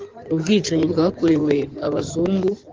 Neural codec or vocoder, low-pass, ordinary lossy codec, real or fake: codec, 16 kHz in and 24 kHz out, 2.2 kbps, FireRedTTS-2 codec; 7.2 kHz; Opus, 16 kbps; fake